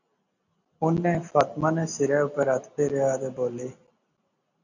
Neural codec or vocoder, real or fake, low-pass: none; real; 7.2 kHz